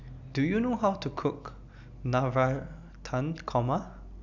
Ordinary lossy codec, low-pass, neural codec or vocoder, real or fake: none; 7.2 kHz; none; real